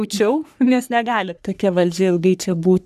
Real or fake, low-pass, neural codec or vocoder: fake; 14.4 kHz; codec, 44.1 kHz, 3.4 kbps, Pupu-Codec